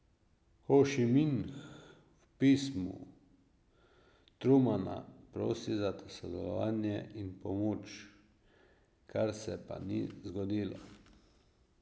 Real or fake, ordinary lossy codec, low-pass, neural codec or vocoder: real; none; none; none